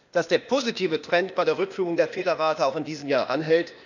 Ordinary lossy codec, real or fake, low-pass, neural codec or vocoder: none; fake; 7.2 kHz; codec, 16 kHz, 0.8 kbps, ZipCodec